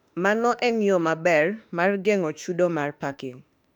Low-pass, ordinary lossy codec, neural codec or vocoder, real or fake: 19.8 kHz; none; autoencoder, 48 kHz, 32 numbers a frame, DAC-VAE, trained on Japanese speech; fake